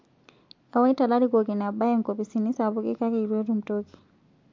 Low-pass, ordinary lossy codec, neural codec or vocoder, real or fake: 7.2 kHz; MP3, 48 kbps; none; real